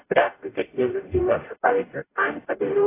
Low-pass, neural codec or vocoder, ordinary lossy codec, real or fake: 3.6 kHz; codec, 44.1 kHz, 0.9 kbps, DAC; AAC, 16 kbps; fake